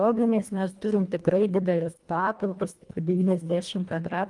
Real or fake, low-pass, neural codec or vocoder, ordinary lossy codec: fake; 10.8 kHz; codec, 24 kHz, 1.5 kbps, HILCodec; Opus, 32 kbps